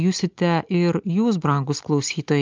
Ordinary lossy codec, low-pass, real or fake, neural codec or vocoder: Opus, 24 kbps; 7.2 kHz; real; none